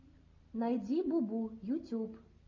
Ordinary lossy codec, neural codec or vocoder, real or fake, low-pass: AAC, 48 kbps; none; real; 7.2 kHz